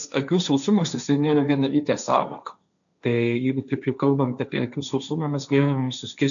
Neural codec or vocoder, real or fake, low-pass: codec, 16 kHz, 1.1 kbps, Voila-Tokenizer; fake; 7.2 kHz